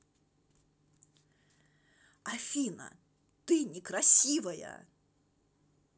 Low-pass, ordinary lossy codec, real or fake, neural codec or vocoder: none; none; real; none